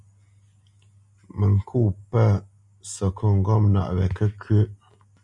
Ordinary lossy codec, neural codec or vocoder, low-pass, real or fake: MP3, 96 kbps; none; 10.8 kHz; real